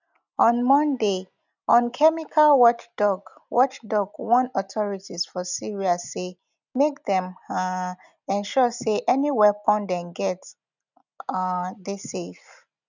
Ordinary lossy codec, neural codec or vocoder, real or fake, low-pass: none; none; real; 7.2 kHz